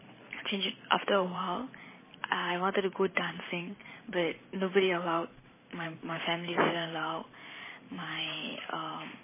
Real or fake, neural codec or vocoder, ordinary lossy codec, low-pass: fake; vocoder, 44.1 kHz, 128 mel bands every 512 samples, BigVGAN v2; MP3, 16 kbps; 3.6 kHz